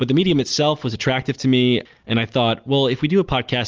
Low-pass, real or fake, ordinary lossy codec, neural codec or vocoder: 7.2 kHz; real; Opus, 24 kbps; none